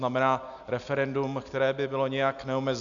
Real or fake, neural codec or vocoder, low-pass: real; none; 7.2 kHz